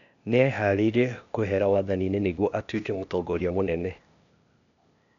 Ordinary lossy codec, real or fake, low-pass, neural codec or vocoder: none; fake; 7.2 kHz; codec, 16 kHz, 0.8 kbps, ZipCodec